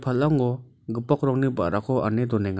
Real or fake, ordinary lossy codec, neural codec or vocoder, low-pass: real; none; none; none